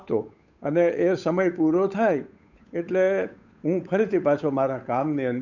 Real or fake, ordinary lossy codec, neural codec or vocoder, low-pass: fake; none; codec, 16 kHz, 8 kbps, FunCodec, trained on Chinese and English, 25 frames a second; 7.2 kHz